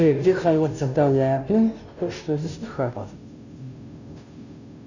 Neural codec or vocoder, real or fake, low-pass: codec, 16 kHz, 0.5 kbps, FunCodec, trained on Chinese and English, 25 frames a second; fake; 7.2 kHz